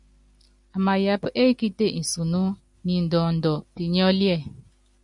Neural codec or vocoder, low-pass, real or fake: none; 10.8 kHz; real